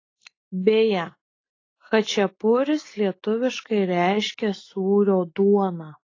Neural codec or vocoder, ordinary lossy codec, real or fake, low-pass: none; AAC, 32 kbps; real; 7.2 kHz